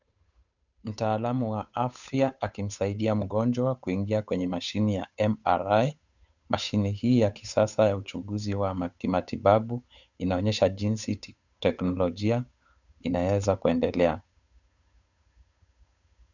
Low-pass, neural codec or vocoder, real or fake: 7.2 kHz; codec, 16 kHz, 8 kbps, FunCodec, trained on Chinese and English, 25 frames a second; fake